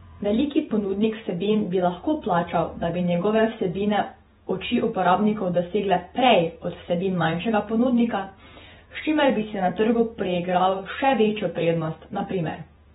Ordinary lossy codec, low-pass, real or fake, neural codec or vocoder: AAC, 16 kbps; 19.8 kHz; fake; vocoder, 48 kHz, 128 mel bands, Vocos